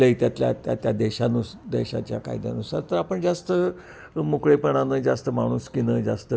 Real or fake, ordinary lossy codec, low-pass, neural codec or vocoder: real; none; none; none